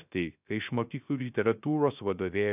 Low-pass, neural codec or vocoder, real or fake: 3.6 kHz; codec, 16 kHz, 0.3 kbps, FocalCodec; fake